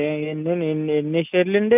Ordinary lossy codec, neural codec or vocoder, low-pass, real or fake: none; none; 3.6 kHz; real